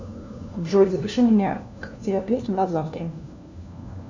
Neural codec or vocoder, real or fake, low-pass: codec, 16 kHz, 1 kbps, FunCodec, trained on LibriTTS, 50 frames a second; fake; 7.2 kHz